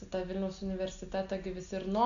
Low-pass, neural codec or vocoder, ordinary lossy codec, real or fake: 7.2 kHz; none; Opus, 64 kbps; real